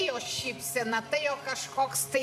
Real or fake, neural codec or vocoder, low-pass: fake; vocoder, 44.1 kHz, 128 mel bands every 512 samples, BigVGAN v2; 14.4 kHz